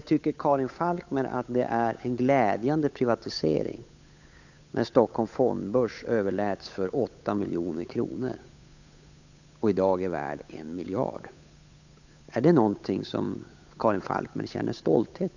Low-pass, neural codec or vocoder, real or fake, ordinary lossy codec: 7.2 kHz; codec, 16 kHz, 8 kbps, FunCodec, trained on Chinese and English, 25 frames a second; fake; none